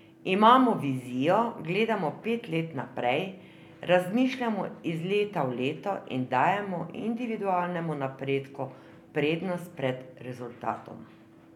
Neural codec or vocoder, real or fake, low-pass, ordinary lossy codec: none; real; 19.8 kHz; none